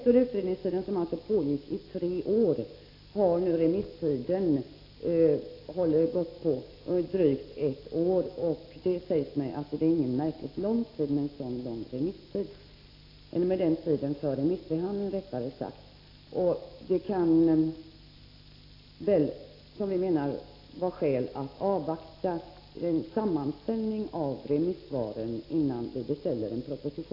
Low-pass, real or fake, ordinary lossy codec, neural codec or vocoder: 5.4 kHz; real; AAC, 32 kbps; none